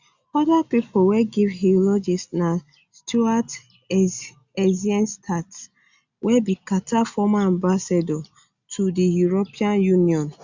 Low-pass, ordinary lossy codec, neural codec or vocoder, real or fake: 7.2 kHz; Opus, 64 kbps; none; real